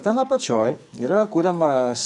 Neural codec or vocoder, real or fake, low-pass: codec, 44.1 kHz, 2.6 kbps, SNAC; fake; 10.8 kHz